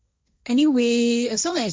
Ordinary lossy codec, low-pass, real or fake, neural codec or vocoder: none; none; fake; codec, 16 kHz, 1.1 kbps, Voila-Tokenizer